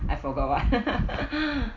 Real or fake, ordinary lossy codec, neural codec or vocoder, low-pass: real; none; none; 7.2 kHz